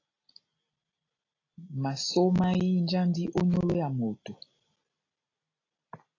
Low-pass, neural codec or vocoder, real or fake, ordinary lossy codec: 7.2 kHz; none; real; AAC, 32 kbps